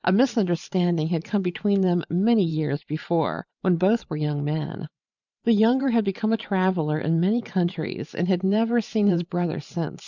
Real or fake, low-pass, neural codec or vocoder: fake; 7.2 kHz; vocoder, 44.1 kHz, 128 mel bands every 512 samples, BigVGAN v2